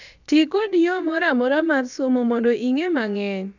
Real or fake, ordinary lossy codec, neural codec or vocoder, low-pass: fake; none; codec, 16 kHz, about 1 kbps, DyCAST, with the encoder's durations; 7.2 kHz